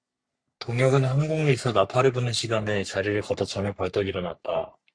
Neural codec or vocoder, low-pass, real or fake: codec, 44.1 kHz, 3.4 kbps, Pupu-Codec; 9.9 kHz; fake